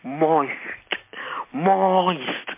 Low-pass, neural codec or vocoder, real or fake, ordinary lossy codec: 3.6 kHz; none; real; MP3, 24 kbps